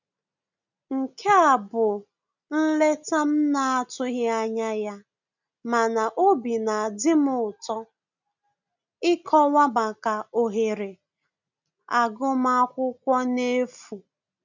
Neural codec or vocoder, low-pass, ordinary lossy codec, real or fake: none; 7.2 kHz; none; real